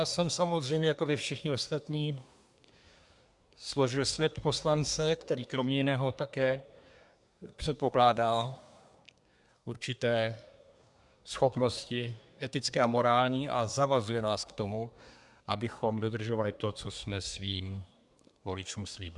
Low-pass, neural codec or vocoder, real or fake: 10.8 kHz; codec, 24 kHz, 1 kbps, SNAC; fake